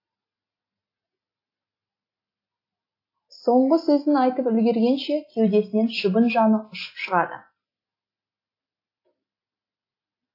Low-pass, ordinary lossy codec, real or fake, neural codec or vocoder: 5.4 kHz; AAC, 32 kbps; real; none